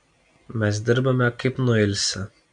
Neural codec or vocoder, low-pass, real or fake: none; 9.9 kHz; real